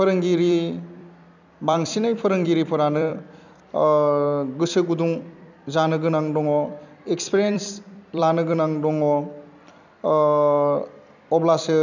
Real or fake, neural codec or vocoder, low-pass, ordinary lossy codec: fake; vocoder, 44.1 kHz, 128 mel bands every 256 samples, BigVGAN v2; 7.2 kHz; none